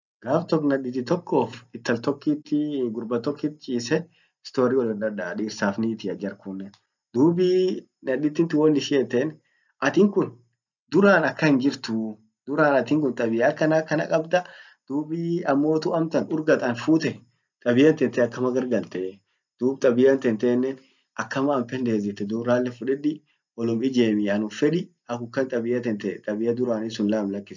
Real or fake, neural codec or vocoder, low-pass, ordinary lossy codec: real; none; 7.2 kHz; none